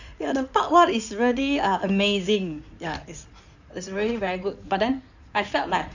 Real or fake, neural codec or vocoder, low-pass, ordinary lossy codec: fake; codec, 16 kHz in and 24 kHz out, 2.2 kbps, FireRedTTS-2 codec; 7.2 kHz; none